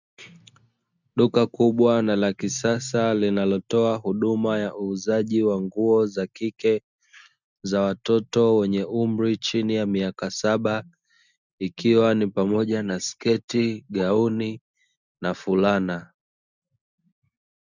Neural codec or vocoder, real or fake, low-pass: none; real; 7.2 kHz